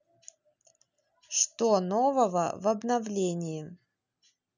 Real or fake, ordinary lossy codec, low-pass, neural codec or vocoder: real; none; 7.2 kHz; none